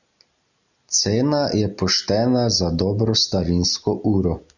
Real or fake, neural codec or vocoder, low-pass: real; none; 7.2 kHz